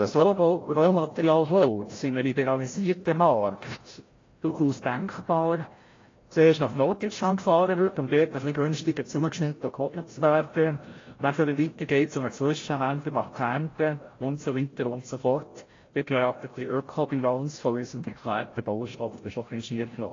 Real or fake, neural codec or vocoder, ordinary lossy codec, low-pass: fake; codec, 16 kHz, 0.5 kbps, FreqCodec, larger model; AAC, 32 kbps; 7.2 kHz